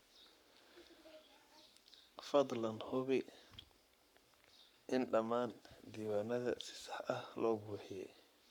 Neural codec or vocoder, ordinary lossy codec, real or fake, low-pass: codec, 44.1 kHz, 7.8 kbps, Pupu-Codec; none; fake; 19.8 kHz